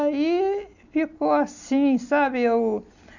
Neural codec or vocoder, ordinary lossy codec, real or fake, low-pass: none; none; real; 7.2 kHz